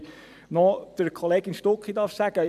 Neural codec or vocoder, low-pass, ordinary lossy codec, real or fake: vocoder, 44.1 kHz, 128 mel bands every 256 samples, BigVGAN v2; 14.4 kHz; AAC, 96 kbps; fake